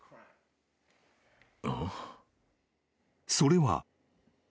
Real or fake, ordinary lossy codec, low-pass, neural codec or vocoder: real; none; none; none